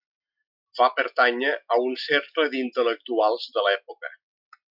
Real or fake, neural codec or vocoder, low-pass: real; none; 5.4 kHz